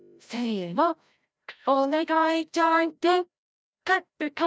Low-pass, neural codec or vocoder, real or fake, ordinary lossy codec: none; codec, 16 kHz, 0.5 kbps, FreqCodec, larger model; fake; none